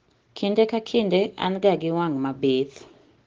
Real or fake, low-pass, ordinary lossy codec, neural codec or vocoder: real; 7.2 kHz; Opus, 16 kbps; none